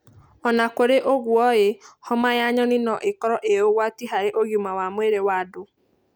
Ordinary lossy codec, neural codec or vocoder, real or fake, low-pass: none; none; real; none